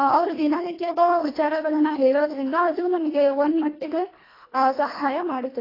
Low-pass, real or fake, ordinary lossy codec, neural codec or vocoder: 5.4 kHz; fake; AAC, 24 kbps; codec, 24 kHz, 1.5 kbps, HILCodec